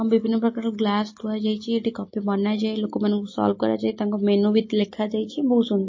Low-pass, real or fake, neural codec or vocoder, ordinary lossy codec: 7.2 kHz; real; none; MP3, 32 kbps